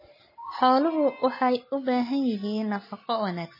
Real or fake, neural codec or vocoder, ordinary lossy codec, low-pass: real; none; MP3, 24 kbps; 5.4 kHz